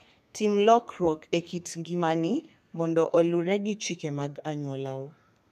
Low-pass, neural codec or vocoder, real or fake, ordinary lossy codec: 14.4 kHz; codec, 32 kHz, 1.9 kbps, SNAC; fake; none